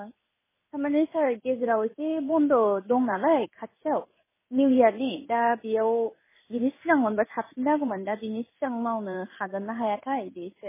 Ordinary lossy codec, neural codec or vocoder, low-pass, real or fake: MP3, 16 kbps; codec, 16 kHz in and 24 kHz out, 1 kbps, XY-Tokenizer; 3.6 kHz; fake